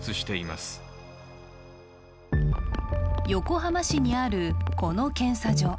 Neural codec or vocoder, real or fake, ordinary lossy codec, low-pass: none; real; none; none